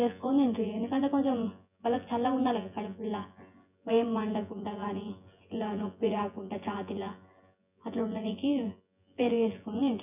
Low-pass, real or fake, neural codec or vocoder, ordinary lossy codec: 3.6 kHz; fake; vocoder, 24 kHz, 100 mel bands, Vocos; none